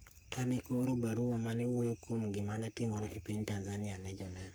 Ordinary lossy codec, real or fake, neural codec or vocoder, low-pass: none; fake; codec, 44.1 kHz, 3.4 kbps, Pupu-Codec; none